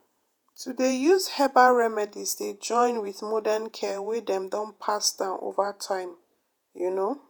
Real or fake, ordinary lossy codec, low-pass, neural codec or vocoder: fake; none; none; vocoder, 48 kHz, 128 mel bands, Vocos